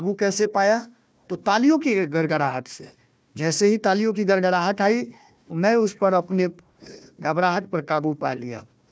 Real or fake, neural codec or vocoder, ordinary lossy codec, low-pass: fake; codec, 16 kHz, 1 kbps, FunCodec, trained on Chinese and English, 50 frames a second; none; none